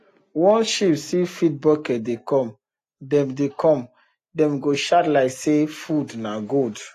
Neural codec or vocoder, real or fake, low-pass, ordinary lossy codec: none; real; 14.4 kHz; AAC, 48 kbps